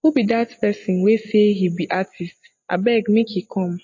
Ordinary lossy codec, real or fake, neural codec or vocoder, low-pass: MP3, 32 kbps; real; none; 7.2 kHz